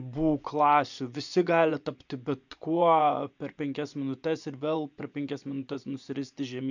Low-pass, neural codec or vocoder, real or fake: 7.2 kHz; vocoder, 24 kHz, 100 mel bands, Vocos; fake